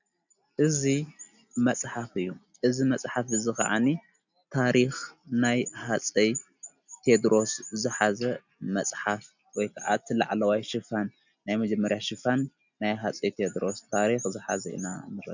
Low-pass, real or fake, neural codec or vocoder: 7.2 kHz; real; none